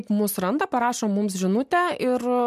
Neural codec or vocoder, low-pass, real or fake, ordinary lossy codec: none; 14.4 kHz; real; MP3, 96 kbps